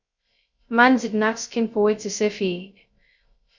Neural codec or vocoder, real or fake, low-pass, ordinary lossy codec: codec, 16 kHz, 0.2 kbps, FocalCodec; fake; 7.2 kHz; Opus, 64 kbps